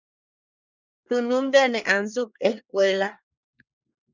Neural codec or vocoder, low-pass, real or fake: codec, 24 kHz, 1 kbps, SNAC; 7.2 kHz; fake